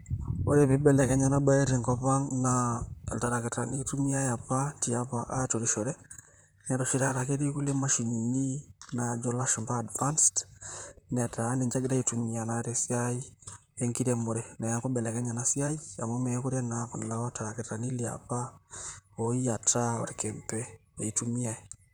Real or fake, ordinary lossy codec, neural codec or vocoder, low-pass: fake; none; vocoder, 44.1 kHz, 128 mel bands, Pupu-Vocoder; none